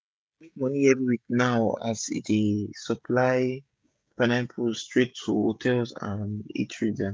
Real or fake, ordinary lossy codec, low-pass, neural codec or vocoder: fake; none; none; codec, 16 kHz, 16 kbps, FreqCodec, smaller model